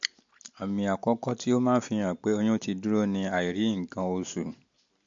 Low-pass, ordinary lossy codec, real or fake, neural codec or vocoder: 7.2 kHz; MP3, 48 kbps; real; none